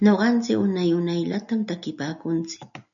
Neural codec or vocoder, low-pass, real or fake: none; 7.2 kHz; real